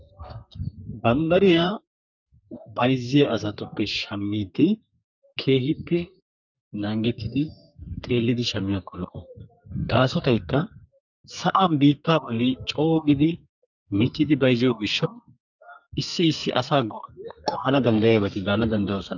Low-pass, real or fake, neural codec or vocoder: 7.2 kHz; fake; codec, 32 kHz, 1.9 kbps, SNAC